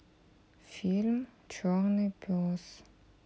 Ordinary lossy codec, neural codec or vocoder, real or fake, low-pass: none; none; real; none